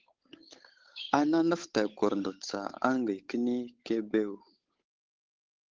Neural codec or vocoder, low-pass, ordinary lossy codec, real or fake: codec, 16 kHz, 8 kbps, FunCodec, trained on Chinese and English, 25 frames a second; 7.2 kHz; Opus, 16 kbps; fake